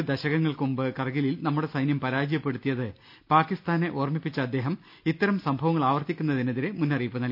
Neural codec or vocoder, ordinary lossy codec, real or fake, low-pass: none; none; real; 5.4 kHz